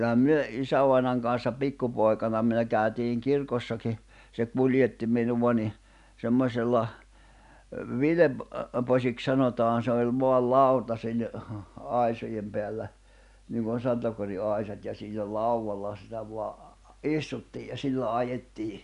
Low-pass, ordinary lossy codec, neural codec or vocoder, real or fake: 10.8 kHz; none; none; real